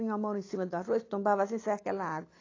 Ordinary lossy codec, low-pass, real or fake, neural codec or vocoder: AAC, 32 kbps; 7.2 kHz; real; none